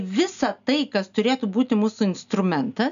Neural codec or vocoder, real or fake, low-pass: none; real; 7.2 kHz